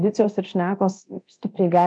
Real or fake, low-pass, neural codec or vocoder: fake; 9.9 kHz; codec, 24 kHz, 0.9 kbps, DualCodec